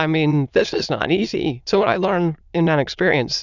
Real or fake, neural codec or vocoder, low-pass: fake; autoencoder, 22.05 kHz, a latent of 192 numbers a frame, VITS, trained on many speakers; 7.2 kHz